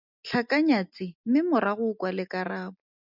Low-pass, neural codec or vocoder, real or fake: 5.4 kHz; none; real